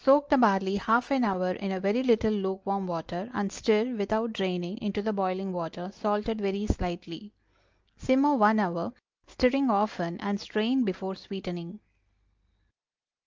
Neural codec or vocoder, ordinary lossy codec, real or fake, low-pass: none; Opus, 24 kbps; real; 7.2 kHz